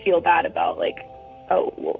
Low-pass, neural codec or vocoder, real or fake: 7.2 kHz; vocoder, 44.1 kHz, 128 mel bands, Pupu-Vocoder; fake